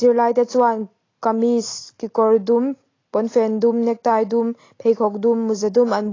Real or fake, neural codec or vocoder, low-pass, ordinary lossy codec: real; none; 7.2 kHz; AAC, 32 kbps